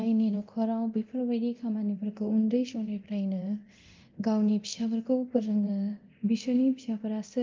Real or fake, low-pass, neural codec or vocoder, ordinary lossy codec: fake; 7.2 kHz; codec, 24 kHz, 0.9 kbps, DualCodec; Opus, 32 kbps